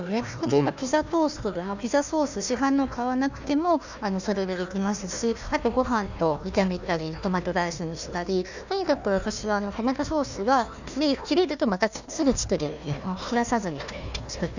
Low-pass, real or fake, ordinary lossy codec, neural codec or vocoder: 7.2 kHz; fake; none; codec, 16 kHz, 1 kbps, FunCodec, trained on Chinese and English, 50 frames a second